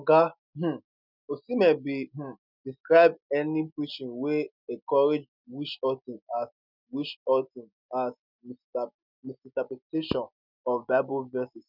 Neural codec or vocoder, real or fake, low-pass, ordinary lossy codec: none; real; 5.4 kHz; none